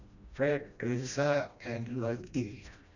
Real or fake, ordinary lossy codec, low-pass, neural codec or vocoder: fake; none; 7.2 kHz; codec, 16 kHz, 1 kbps, FreqCodec, smaller model